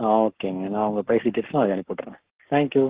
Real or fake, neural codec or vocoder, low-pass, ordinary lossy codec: fake; codec, 44.1 kHz, 7.8 kbps, Pupu-Codec; 3.6 kHz; Opus, 16 kbps